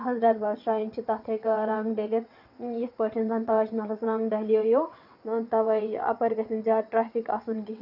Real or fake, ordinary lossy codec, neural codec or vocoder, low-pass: fake; none; vocoder, 22.05 kHz, 80 mel bands, WaveNeXt; 5.4 kHz